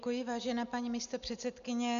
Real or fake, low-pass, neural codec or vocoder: real; 7.2 kHz; none